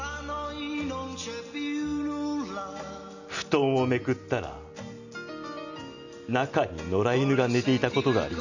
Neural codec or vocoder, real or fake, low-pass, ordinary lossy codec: none; real; 7.2 kHz; none